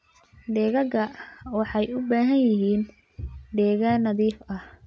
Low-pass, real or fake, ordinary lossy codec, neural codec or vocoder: none; real; none; none